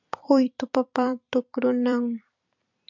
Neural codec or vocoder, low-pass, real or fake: vocoder, 44.1 kHz, 128 mel bands every 256 samples, BigVGAN v2; 7.2 kHz; fake